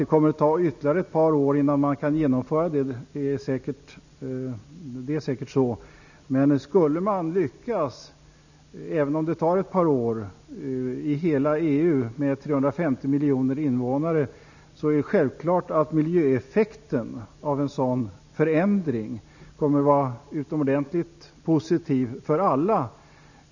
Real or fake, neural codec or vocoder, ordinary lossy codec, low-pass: real; none; none; 7.2 kHz